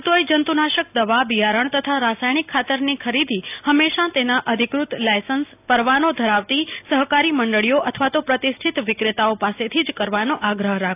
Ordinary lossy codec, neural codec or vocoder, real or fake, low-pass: AAC, 32 kbps; none; real; 3.6 kHz